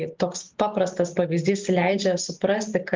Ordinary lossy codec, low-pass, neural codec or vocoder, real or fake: Opus, 32 kbps; 7.2 kHz; none; real